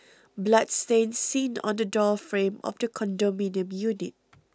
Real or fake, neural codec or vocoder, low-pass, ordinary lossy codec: real; none; none; none